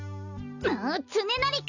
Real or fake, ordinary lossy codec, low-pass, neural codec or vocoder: real; none; 7.2 kHz; none